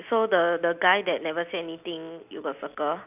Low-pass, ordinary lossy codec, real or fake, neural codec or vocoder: 3.6 kHz; none; real; none